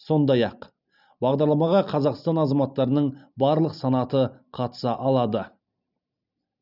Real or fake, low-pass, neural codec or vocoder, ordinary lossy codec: real; 5.4 kHz; none; none